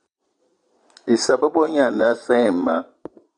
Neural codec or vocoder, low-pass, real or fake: vocoder, 22.05 kHz, 80 mel bands, Vocos; 9.9 kHz; fake